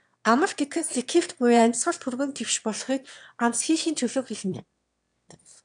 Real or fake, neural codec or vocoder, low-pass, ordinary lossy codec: fake; autoencoder, 22.05 kHz, a latent of 192 numbers a frame, VITS, trained on one speaker; 9.9 kHz; MP3, 96 kbps